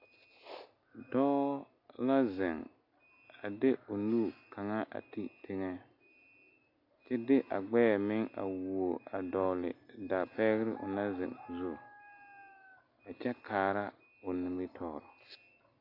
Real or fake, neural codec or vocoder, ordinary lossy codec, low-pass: real; none; AAC, 32 kbps; 5.4 kHz